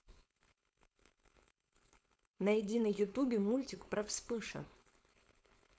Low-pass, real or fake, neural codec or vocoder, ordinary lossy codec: none; fake; codec, 16 kHz, 4.8 kbps, FACodec; none